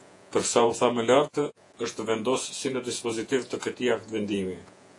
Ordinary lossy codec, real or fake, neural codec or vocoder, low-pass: AAC, 48 kbps; fake; vocoder, 48 kHz, 128 mel bands, Vocos; 10.8 kHz